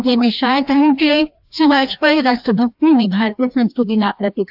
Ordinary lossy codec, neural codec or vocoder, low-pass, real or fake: AAC, 48 kbps; codec, 16 kHz, 1 kbps, FreqCodec, larger model; 5.4 kHz; fake